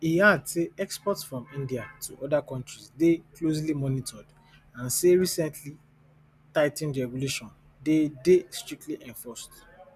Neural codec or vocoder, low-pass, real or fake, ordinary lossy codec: none; 14.4 kHz; real; none